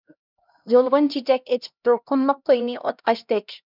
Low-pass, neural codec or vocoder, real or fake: 5.4 kHz; codec, 16 kHz, 1 kbps, X-Codec, HuBERT features, trained on LibriSpeech; fake